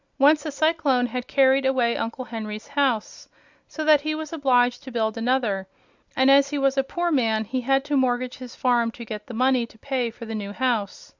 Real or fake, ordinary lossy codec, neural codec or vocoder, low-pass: real; Opus, 64 kbps; none; 7.2 kHz